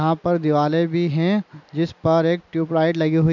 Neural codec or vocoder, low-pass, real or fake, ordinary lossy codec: none; 7.2 kHz; real; none